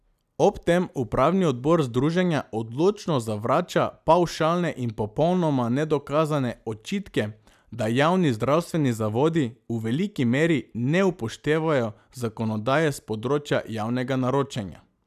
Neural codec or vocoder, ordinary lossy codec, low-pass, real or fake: none; none; 14.4 kHz; real